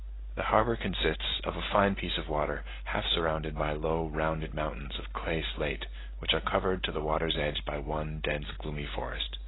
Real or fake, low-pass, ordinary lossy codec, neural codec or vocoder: real; 7.2 kHz; AAC, 16 kbps; none